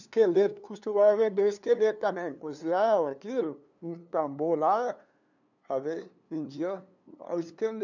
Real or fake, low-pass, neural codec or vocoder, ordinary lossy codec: fake; 7.2 kHz; codec, 16 kHz, 2 kbps, FunCodec, trained on LibriTTS, 25 frames a second; none